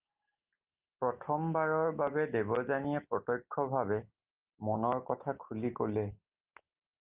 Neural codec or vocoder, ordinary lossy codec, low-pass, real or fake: none; Opus, 24 kbps; 3.6 kHz; real